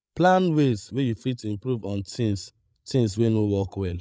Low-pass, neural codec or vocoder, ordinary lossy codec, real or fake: none; codec, 16 kHz, 8 kbps, FreqCodec, larger model; none; fake